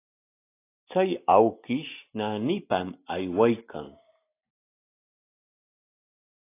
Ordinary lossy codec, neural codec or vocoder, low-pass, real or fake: AAC, 24 kbps; none; 3.6 kHz; real